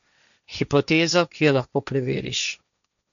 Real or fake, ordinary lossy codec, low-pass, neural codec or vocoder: fake; none; 7.2 kHz; codec, 16 kHz, 1.1 kbps, Voila-Tokenizer